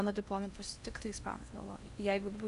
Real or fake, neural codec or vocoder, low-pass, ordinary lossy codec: fake; codec, 16 kHz in and 24 kHz out, 0.8 kbps, FocalCodec, streaming, 65536 codes; 10.8 kHz; AAC, 96 kbps